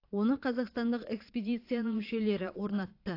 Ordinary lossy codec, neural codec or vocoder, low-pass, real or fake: AAC, 32 kbps; vocoder, 22.05 kHz, 80 mel bands, Vocos; 5.4 kHz; fake